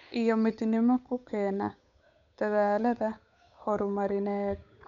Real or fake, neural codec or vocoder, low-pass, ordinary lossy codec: fake; codec, 16 kHz, 8 kbps, FunCodec, trained on Chinese and English, 25 frames a second; 7.2 kHz; none